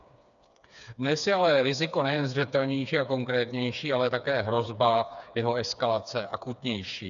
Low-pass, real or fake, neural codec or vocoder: 7.2 kHz; fake; codec, 16 kHz, 4 kbps, FreqCodec, smaller model